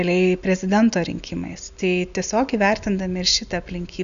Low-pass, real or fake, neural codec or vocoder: 7.2 kHz; real; none